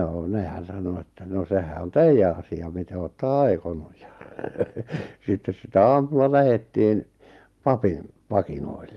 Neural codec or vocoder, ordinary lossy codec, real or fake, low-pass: none; Opus, 32 kbps; real; 19.8 kHz